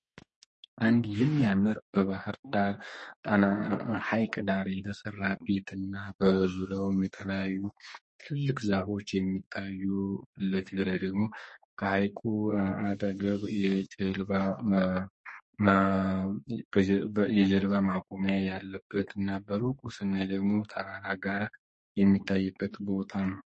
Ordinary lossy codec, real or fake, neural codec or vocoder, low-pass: MP3, 32 kbps; fake; codec, 32 kHz, 1.9 kbps, SNAC; 10.8 kHz